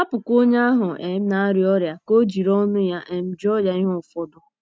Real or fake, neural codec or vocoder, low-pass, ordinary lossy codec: real; none; none; none